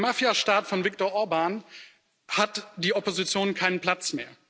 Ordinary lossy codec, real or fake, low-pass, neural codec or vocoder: none; real; none; none